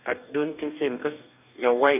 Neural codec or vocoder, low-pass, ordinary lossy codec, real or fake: codec, 44.1 kHz, 2.6 kbps, DAC; 3.6 kHz; none; fake